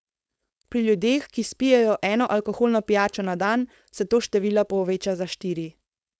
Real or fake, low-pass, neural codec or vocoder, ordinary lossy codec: fake; none; codec, 16 kHz, 4.8 kbps, FACodec; none